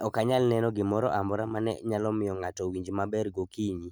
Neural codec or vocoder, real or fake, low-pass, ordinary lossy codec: none; real; none; none